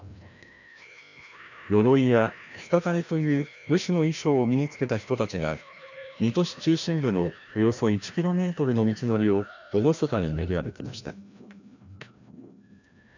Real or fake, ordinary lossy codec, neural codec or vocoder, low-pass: fake; none; codec, 16 kHz, 1 kbps, FreqCodec, larger model; 7.2 kHz